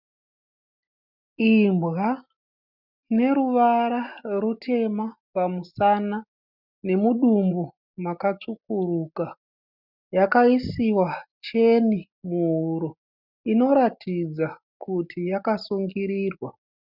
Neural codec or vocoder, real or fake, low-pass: none; real; 5.4 kHz